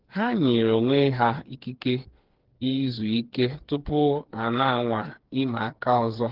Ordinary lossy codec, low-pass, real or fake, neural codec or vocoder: Opus, 16 kbps; 5.4 kHz; fake; codec, 16 kHz, 4 kbps, FreqCodec, smaller model